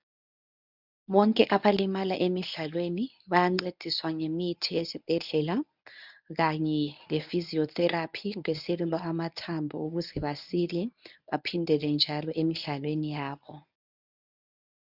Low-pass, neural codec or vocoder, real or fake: 5.4 kHz; codec, 24 kHz, 0.9 kbps, WavTokenizer, medium speech release version 1; fake